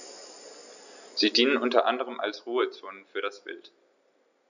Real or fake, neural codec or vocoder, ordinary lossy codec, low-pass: real; none; none; 7.2 kHz